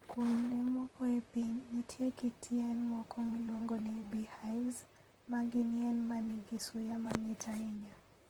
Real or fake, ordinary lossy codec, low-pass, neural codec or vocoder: fake; Opus, 24 kbps; 19.8 kHz; vocoder, 44.1 kHz, 128 mel bands, Pupu-Vocoder